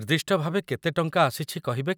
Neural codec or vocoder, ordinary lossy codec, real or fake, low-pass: none; none; real; none